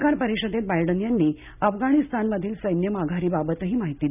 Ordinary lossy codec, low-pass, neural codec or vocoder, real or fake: none; 3.6 kHz; none; real